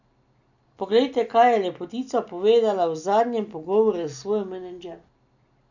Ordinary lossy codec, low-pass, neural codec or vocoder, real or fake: none; 7.2 kHz; none; real